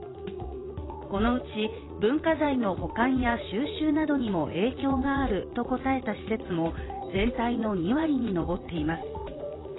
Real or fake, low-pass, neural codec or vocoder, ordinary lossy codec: fake; 7.2 kHz; vocoder, 44.1 kHz, 80 mel bands, Vocos; AAC, 16 kbps